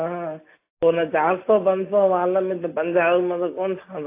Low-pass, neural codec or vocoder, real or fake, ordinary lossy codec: 3.6 kHz; none; real; AAC, 24 kbps